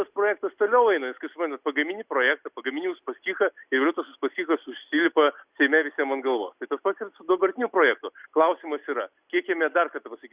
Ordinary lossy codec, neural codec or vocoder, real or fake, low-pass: Opus, 64 kbps; none; real; 3.6 kHz